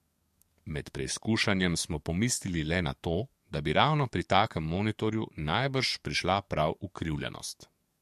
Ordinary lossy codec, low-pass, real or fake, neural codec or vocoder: MP3, 64 kbps; 14.4 kHz; fake; codec, 44.1 kHz, 7.8 kbps, DAC